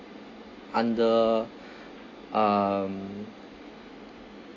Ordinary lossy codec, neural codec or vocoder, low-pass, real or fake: AAC, 32 kbps; none; 7.2 kHz; real